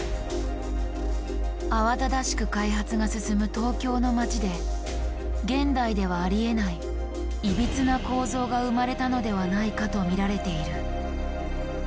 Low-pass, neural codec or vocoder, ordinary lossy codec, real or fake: none; none; none; real